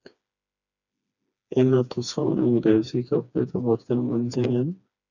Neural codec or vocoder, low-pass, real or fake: codec, 16 kHz, 2 kbps, FreqCodec, smaller model; 7.2 kHz; fake